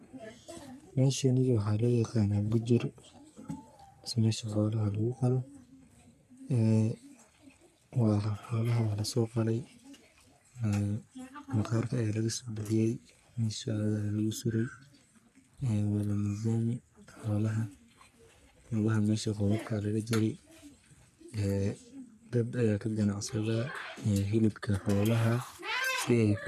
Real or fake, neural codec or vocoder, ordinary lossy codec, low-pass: fake; codec, 44.1 kHz, 3.4 kbps, Pupu-Codec; none; 14.4 kHz